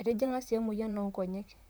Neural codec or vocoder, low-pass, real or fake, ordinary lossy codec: vocoder, 44.1 kHz, 128 mel bands, Pupu-Vocoder; none; fake; none